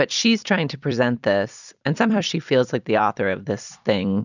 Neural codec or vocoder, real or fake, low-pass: vocoder, 44.1 kHz, 128 mel bands every 256 samples, BigVGAN v2; fake; 7.2 kHz